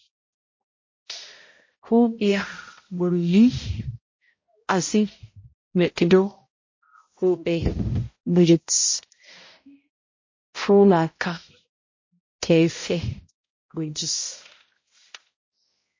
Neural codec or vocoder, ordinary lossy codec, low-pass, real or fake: codec, 16 kHz, 0.5 kbps, X-Codec, HuBERT features, trained on balanced general audio; MP3, 32 kbps; 7.2 kHz; fake